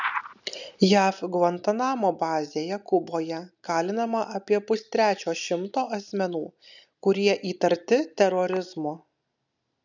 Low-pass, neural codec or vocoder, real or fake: 7.2 kHz; none; real